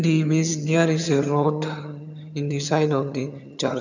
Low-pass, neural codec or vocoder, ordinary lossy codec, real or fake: 7.2 kHz; vocoder, 22.05 kHz, 80 mel bands, HiFi-GAN; none; fake